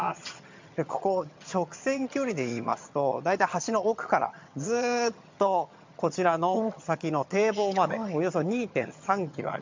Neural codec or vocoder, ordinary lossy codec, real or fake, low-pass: vocoder, 22.05 kHz, 80 mel bands, HiFi-GAN; MP3, 64 kbps; fake; 7.2 kHz